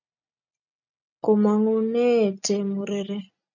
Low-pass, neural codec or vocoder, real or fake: 7.2 kHz; none; real